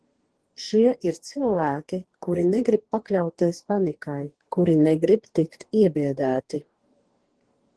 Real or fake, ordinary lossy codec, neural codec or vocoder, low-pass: fake; Opus, 16 kbps; codec, 44.1 kHz, 2.6 kbps, DAC; 10.8 kHz